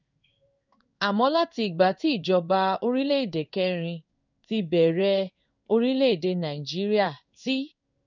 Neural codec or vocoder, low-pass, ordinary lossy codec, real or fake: codec, 16 kHz in and 24 kHz out, 1 kbps, XY-Tokenizer; 7.2 kHz; none; fake